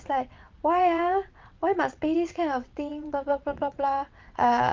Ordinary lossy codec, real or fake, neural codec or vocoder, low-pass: Opus, 24 kbps; fake; vocoder, 22.05 kHz, 80 mel bands, WaveNeXt; 7.2 kHz